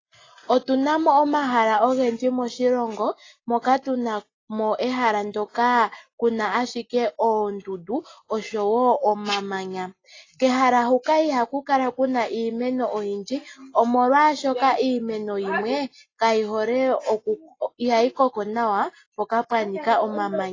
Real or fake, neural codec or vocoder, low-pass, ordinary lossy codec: real; none; 7.2 kHz; AAC, 32 kbps